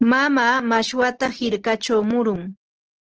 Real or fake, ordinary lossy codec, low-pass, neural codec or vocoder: real; Opus, 16 kbps; 7.2 kHz; none